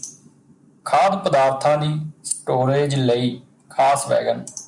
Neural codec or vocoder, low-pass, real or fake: none; 10.8 kHz; real